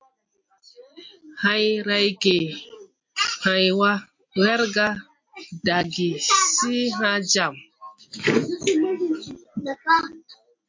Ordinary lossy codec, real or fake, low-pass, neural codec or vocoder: MP3, 48 kbps; real; 7.2 kHz; none